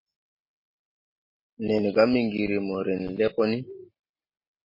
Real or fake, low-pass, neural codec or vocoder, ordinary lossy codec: real; 5.4 kHz; none; MP3, 24 kbps